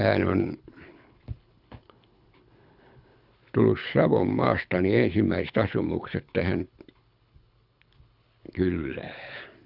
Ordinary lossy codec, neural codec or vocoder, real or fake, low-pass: Opus, 64 kbps; codec, 24 kHz, 6 kbps, HILCodec; fake; 5.4 kHz